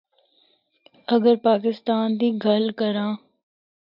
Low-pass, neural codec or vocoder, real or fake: 5.4 kHz; none; real